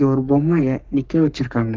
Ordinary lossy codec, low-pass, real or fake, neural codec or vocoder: Opus, 32 kbps; 7.2 kHz; fake; codec, 44.1 kHz, 3.4 kbps, Pupu-Codec